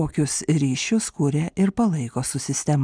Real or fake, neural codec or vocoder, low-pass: real; none; 9.9 kHz